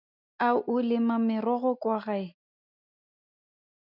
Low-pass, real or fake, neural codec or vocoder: 5.4 kHz; real; none